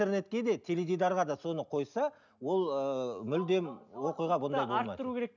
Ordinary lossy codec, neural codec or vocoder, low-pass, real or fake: none; none; 7.2 kHz; real